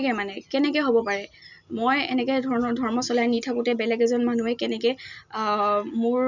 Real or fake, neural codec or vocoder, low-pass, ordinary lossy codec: real; none; 7.2 kHz; none